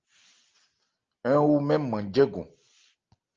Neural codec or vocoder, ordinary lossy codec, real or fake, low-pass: none; Opus, 24 kbps; real; 7.2 kHz